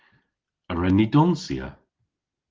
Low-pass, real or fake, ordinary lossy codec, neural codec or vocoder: 7.2 kHz; real; Opus, 16 kbps; none